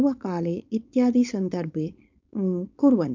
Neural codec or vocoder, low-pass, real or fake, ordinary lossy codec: codec, 16 kHz, 4.8 kbps, FACodec; 7.2 kHz; fake; MP3, 64 kbps